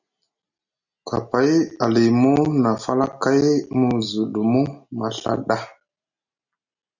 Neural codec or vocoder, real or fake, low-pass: none; real; 7.2 kHz